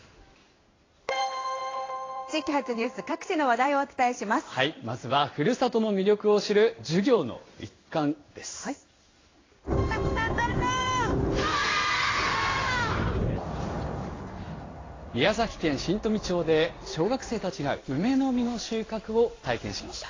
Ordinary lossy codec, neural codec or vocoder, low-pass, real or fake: AAC, 32 kbps; codec, 16 kHz in and 24 kHz out, 1 kbps, XY-Tokenizer; 7.2 kHz; fake